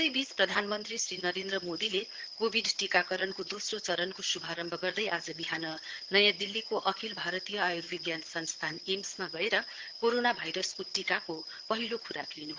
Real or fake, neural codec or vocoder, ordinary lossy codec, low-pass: fake; vocoder, 22.05 kHz, 80 mel bands, HiFi-GAN; Opus, 16 kbps; 7.2 kHz